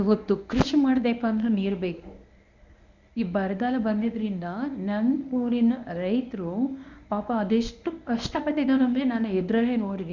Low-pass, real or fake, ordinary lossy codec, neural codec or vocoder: 7.2 kHz; fake; none; codec, 24 kHz, 0.9 kbps, WavTokenizer, medium speech release version 1